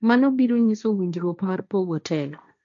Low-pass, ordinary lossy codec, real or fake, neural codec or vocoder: 7.2 kHz; none; fake; codec, 16 kHz, 1.1 kbps, Voila-Tokenizer